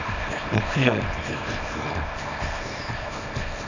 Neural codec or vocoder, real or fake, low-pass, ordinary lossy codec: codec, 24 kHz, 0.9 kbps, WavTokenizer, small release; fake; 7.2 kHz; AAC, 48 kbps